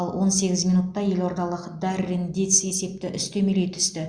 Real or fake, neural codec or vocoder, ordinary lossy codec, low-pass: real; none; Opus, 64 kbps; 9.9 kHz